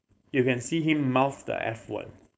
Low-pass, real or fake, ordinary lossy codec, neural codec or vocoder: none; fake; none; codec, 16 kHz, 4.8 kbps, FACodec